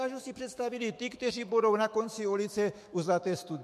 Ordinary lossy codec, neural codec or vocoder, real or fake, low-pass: MP3, 64 kbps; autoencoder, 48 kHz, 128 numbers a frame, DAC-VAE, trained on Japanese speech; fake; 14.4 kHz